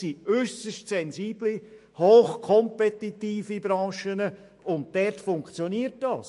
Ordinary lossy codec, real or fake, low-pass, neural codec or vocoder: MP3, 48 kbps; fake; 14.4 kHz; autoencoder, 48 kHz, 128 numbers a frame, DAC-VAE, trained on Japanese speech